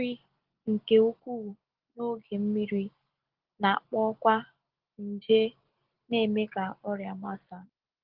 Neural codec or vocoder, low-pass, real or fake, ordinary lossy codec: none; 5.4 kHz; real; Opus, 16 kbps